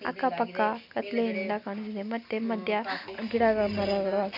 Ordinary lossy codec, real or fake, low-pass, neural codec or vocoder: none; real; 5.4 kHz; none